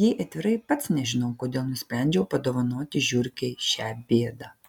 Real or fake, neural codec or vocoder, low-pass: real; none; 19.8 kHz